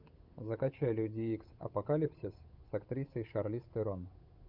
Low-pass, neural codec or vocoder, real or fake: 5.4 kHz; codec, 16 kHz, 8 kbps, FunCodec, trained on Chinese and English, 25 frames a second; fake